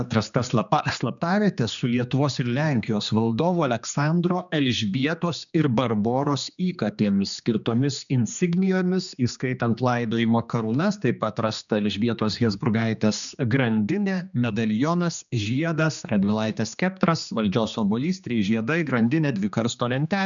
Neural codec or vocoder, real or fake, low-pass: codec, 16 kHz, 2 kbps, X-Codec, HuBERT features, trained on general audio; fake; 7.2 kHz